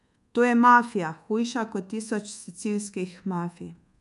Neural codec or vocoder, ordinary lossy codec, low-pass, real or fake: codec, 24 kHz, 1.2 kbps, DualCodec; none; 10.8 kHz; fake